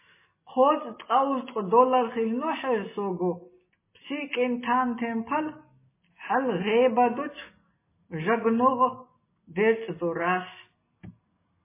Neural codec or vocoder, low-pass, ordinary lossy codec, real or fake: none; 3.6 kHz; MP3, 16 kbps; real